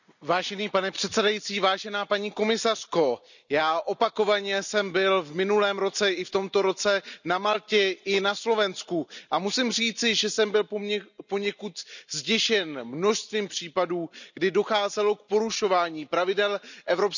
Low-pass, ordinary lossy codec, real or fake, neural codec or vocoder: 7.2 kHz; none; real; none